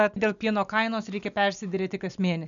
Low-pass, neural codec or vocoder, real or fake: 7.2 kHz; none; real